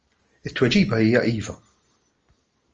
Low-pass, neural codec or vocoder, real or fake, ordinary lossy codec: 7.2 kHz; none; real; Opus, 24 kbps